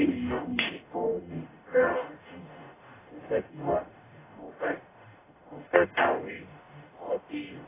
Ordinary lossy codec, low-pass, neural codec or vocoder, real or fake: AAC, 16 kbps; 3.6 kHz; codec, 44.1 kHz, 0.9 kbps, DAC; fake